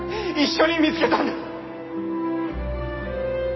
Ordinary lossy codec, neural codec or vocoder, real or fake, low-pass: MP3, 24 kbps; none; real; 7.2 kHz